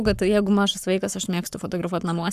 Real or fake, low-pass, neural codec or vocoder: fake; 14.4 kHz; codec, 44.1 kHz, 7.8 kbps, Pupu-Codec